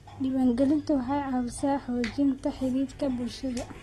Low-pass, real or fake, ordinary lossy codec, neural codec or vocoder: 19.8 kHz; real; AAC, 32 kbps; none